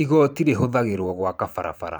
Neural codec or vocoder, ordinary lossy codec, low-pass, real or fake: vocoder, 44.1 kHz, 128 mel bands every 512 samples, BigVGAN v2; none; none; fake